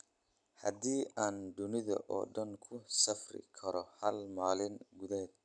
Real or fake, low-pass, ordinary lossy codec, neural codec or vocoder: real; 9.9 kHz; none; none